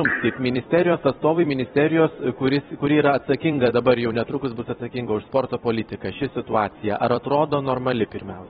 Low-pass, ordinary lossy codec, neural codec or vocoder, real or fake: 19.8 kHz; AAC, 16 kbps; vocoder, 44.1 kHz, 128 mel bands every 256 samples, BigVGAN v2; fake